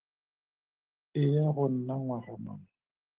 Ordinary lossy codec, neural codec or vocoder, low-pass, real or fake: Opus, 16 kbps; none; 3.6 kHz; real